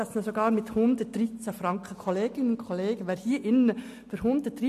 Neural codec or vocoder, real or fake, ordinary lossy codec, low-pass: none; real; MP3, 64 kbps; 14.4 kHz